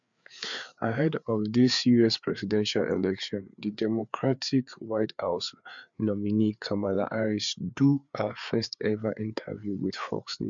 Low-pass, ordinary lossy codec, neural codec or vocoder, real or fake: 7.2 kHz; MP3, 64 kbps; codec, 16 kHz, 4 kbps, FreqCodec, larger model; fake